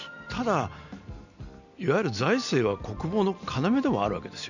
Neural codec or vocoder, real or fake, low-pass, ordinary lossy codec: none; real; 7.2 kHz; none